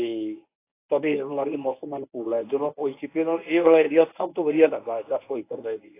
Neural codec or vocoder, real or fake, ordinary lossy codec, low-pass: codec, 24 kHz, 0.9 kbps, WavTokenizer, medium speech release version 1; fake; AAC, 24 kbps; 3.6 kHz